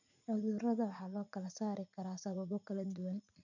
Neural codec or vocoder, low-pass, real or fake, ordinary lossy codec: vocoder, 44.1 kHz, 80 mel bands, Vocos; 7.2 kHz; fake; none